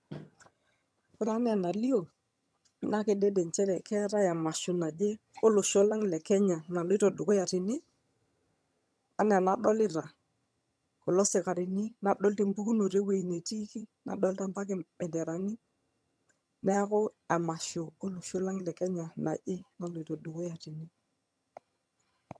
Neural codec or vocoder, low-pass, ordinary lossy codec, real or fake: vocoder, 22.05 kHz, 80 mel bands, HiFi-GAN; none; none; fake